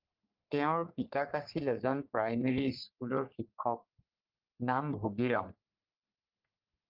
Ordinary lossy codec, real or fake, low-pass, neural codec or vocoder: Opus, 24 kbps; fake; 5.4 kHz; codec, 44.1 kHz, 3.4 kbps, Pupu-Codec